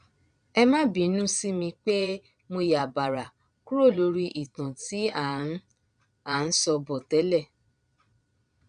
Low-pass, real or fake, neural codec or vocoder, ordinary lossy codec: 9.9 kHz; fake; vocoder, 22.05 kHz, 80 mel bands, Vocos; none